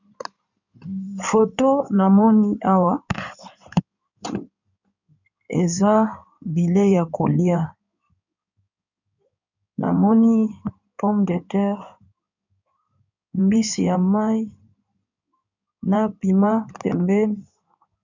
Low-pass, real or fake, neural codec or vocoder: 7.2 kHz; fake; codec, 16 kHz in and 24 kHz out, 2.2 kbps, FireRedTTS-2 codec